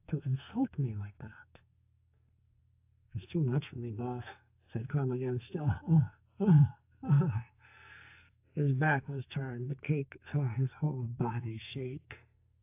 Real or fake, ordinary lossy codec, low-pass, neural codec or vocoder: fake; AAC, 32 kbps; 3.6 kHz; codec, 44.1 kHz, 2.6 kbps, SNAC